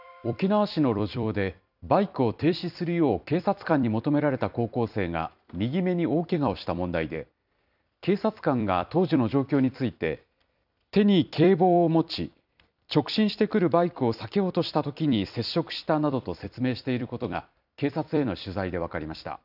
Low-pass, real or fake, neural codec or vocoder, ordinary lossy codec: 5.4 kHz; fake; vocoder, 44.1 kHz, 128 mel bands every 256 samples, BigVGAN v2; none